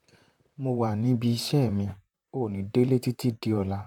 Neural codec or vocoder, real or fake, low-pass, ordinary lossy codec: vocoder, 44.1 kHz, 128 mel bands, Pupu-Vocoder; fake; 19.8 kHz; none